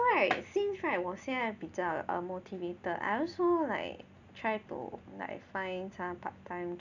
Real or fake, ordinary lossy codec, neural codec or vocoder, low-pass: real; none; none; 7.2 kHz